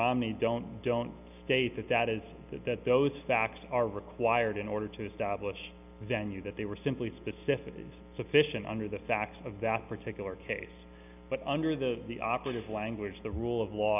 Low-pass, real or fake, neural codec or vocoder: 3.6 kHz; real; none